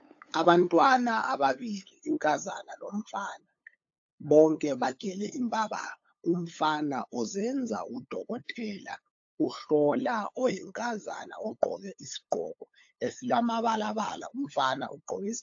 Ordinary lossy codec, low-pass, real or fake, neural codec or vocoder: AAC, 48 kbps; 7.2 kHz; fake; codec, 16 kHz, 8 kbps, FunCodec, trained on LibriTTS, 25 frames a second